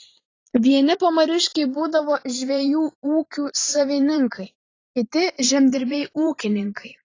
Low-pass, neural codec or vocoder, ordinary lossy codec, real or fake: 7.2 kHz; vocoder, 44.1 kHz, 128 mel bands every 512 samples, BigVGAN v2; AAC, 32 kbps; fake